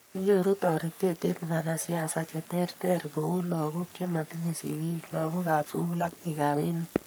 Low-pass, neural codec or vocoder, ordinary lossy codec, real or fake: none; codec, 44.1 kHz, 3.4 kbps, Pupu-Codec; none; fake